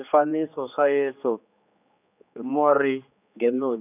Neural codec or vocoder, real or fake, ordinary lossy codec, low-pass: codec, 16 kHz, 2 kbps, X-Codec, HuBERT features, trained on general audio; fake; none; 3.6 kHz